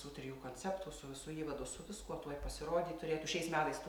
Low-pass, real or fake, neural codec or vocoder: 19.8 kHz; real; none